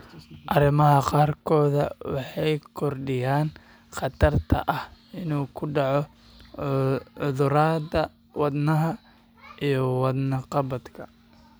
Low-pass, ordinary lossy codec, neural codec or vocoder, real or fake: none; none; none; real